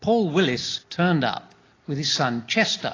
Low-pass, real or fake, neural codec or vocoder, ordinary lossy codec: 7.2 kHz; real; none; AAC, 32 kbps